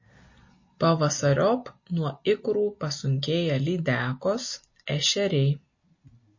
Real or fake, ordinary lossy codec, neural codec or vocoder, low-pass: real; MP3, 32 kbps; none; 7.2 kHz